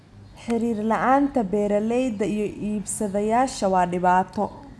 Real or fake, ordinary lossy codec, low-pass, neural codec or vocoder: real; none; none; none